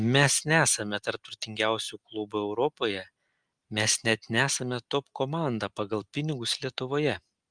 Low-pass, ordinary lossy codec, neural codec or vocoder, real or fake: 9.9 kHz; Opus, 32 kbps; none; real